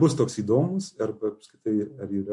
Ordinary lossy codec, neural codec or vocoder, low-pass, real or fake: MP3, 48 kbps; none; 10.8 kHz; real